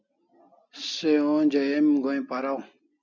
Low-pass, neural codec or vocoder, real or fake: 7.2 kHz; none; real